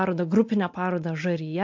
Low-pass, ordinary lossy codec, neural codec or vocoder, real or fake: 7.2 kHz; MP3, 48 kbps; none; real